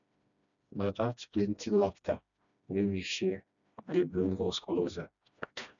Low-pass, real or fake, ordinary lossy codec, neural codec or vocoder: 7.2 kHz; fake; none; codec, 16 kHz, 1 kbps, FreqCodec, smaller model